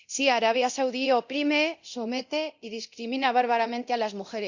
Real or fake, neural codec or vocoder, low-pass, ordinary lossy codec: fake; codec, 24 kHz, 0.9 kbps, DualCodec; 7.2 kHz; Opus, 64 kbps